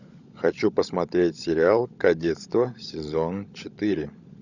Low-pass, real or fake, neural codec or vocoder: 7.2 kHz; fake; codec, 16 kHz, 16 kbps, FunCodec, trained on LibriTTS, 50 frames a second